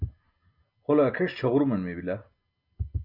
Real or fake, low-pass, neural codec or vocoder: real; 5.4 kHz; none